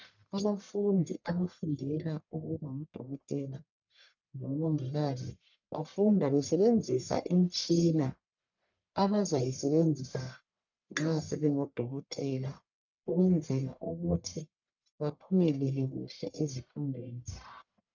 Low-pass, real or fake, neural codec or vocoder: 7.2 kHz; fake; codec, 44.1 kHz, 1.7 kbps, Pupu-Codec